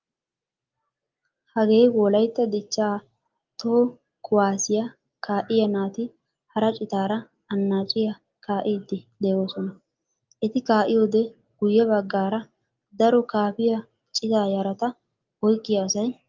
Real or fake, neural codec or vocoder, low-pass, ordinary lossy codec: real; none; 7.2 kHz; Opus, 24 kbps